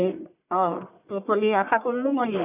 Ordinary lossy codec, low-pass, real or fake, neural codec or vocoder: none; 3.6 kHz; fake; codec, 44.1 kHz, 1.7 kbps, Pupu-Codec